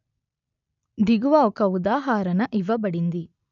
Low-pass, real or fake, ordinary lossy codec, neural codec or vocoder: 7.2 kHz; real; none; none